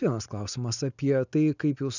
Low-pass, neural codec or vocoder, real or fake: 7.2 kHz; none; real